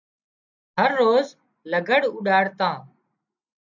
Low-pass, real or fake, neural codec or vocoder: 7.2 kHz; real; none